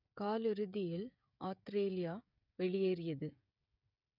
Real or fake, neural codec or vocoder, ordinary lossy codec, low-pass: fake; codec, 16 kHz, 16 kbps, FreqCodec, smaller model; none; 5.4 kHz